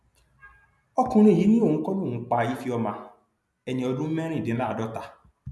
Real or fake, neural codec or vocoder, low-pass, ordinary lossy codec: real; none; none; none